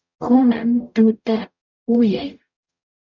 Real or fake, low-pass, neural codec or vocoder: fake; 7.2 kHz; codec, 44.1 kHz, 0.9 kbps, DAC